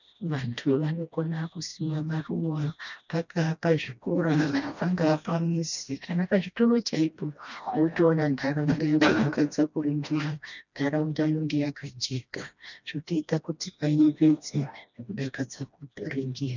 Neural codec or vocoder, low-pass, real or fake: codec, 16 kHz, 1 kbps, FreqCodec, smaller model; 7.2 kHz; fake